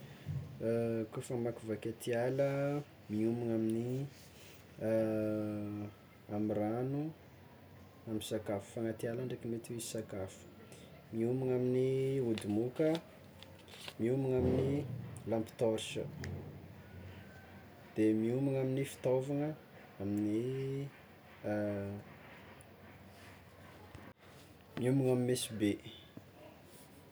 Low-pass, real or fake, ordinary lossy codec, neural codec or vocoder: none; real; none; none